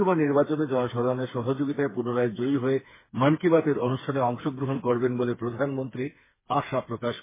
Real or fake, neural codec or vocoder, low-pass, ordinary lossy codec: fake; codec, 16 kHz, 4 kbps, FreqCodec, smaller model; 3.6 kHz; MP3, 16 kbps